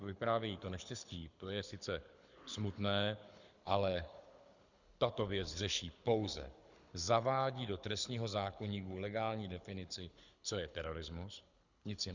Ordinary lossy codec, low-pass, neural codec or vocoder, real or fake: Opus, 64 kbps; 7.2 kHz; codec, 24 kHz, 6 kbps, HILCodec; fake